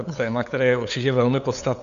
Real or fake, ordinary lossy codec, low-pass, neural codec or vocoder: fake; Opus, 64 kbps; 7.2 kHz; codec, 16 kHz, 8 kbps, FunCodec, trained on LibriTTS, 25 frames a second